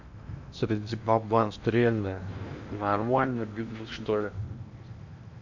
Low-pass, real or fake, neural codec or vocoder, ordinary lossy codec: 7.2 kHz; fake; codec, 16 kHz in and 24 kHz out, 0.8 kbps, FocalCodec, streaming, 65536 codes; MP3, 64 kbps